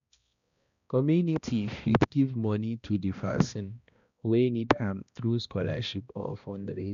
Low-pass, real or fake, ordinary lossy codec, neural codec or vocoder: 7.2 kHz; fake; none; codec, 16 kHz, 1 kbps, X-Codec, HuBERT features, trained on balanced general audio